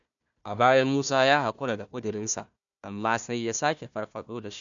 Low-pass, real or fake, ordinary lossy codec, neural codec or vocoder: 7.2 kHz; fake; none; codec, 16 kHz, 1 kbps, FunCodec, trained on Chinese and English, 50 frames a second